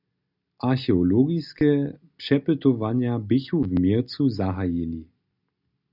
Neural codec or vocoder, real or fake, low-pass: none; real; 5.4 kHz